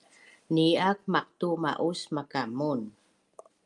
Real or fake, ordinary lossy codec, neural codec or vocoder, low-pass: real; Opus, 32 kbps; none; 10.8 kHz